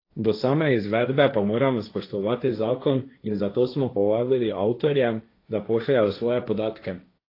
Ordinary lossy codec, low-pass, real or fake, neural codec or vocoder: AAC, 32 kbps; 5.4 kHz; fake; codec, 16 kHz, 1.1 kbps, Voila-Tokenizer